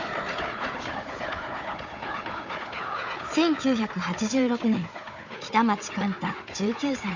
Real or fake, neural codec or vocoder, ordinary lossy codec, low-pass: fake; codec, 16 kHz, 4 kbps, FunCodec, trained on Chinese and English, 50 frames a second; none; 7.2 kHz